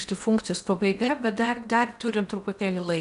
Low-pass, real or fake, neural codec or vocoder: 10.8 kHz; fake; codec, 16 kHz in and 24 kHz out, 0.8 kbps, FocalCodec, streaming, 65536 codes